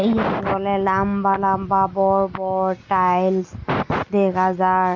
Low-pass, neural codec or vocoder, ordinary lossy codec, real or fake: 7.2 kHz; none; Opus, 64 kbps; real